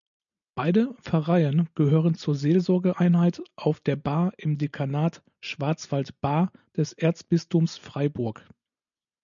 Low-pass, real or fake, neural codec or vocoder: 7.2 kHz; real; none